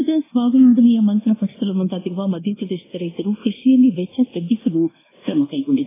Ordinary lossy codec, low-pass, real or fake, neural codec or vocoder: AAC, 16 kbps; 3.6 kHz; fake; codec, 24 kHz, 1.2 kbps, DualCodec